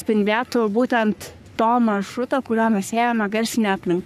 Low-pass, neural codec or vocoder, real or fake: 14.4 kHz; codec, 44.1 kHz, 3.4 kbps, Pupu-Codec; fake